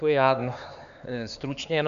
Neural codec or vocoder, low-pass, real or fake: codec, 16 kHz, 4 kbps, X-Codec, HuBERT features, trained on LibriSpeech; 7.2 kHz; fake